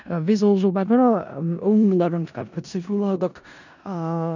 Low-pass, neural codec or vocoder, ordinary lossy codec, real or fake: 7.2 kHz; codec, 16 kHz in and 24 kHz out, 0.4 kbps, LongCat-Audio-Codec, four codebook decoder; none; fake